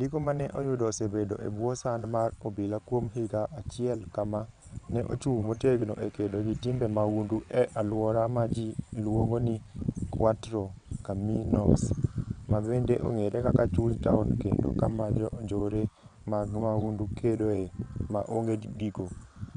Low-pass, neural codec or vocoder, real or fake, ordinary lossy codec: 9.9 kHz; vocoder, 22.05 kHz, 80 mel bands, WaveNeXt; fake; none